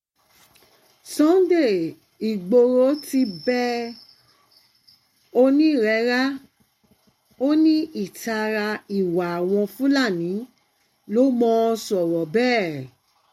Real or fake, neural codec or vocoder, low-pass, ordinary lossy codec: real; none; 19.8 kHz; MP3, 64 kbps